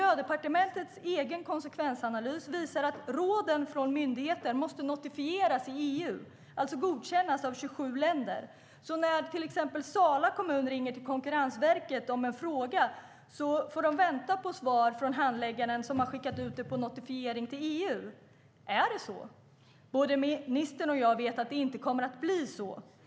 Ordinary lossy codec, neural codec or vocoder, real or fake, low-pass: none; none; real; none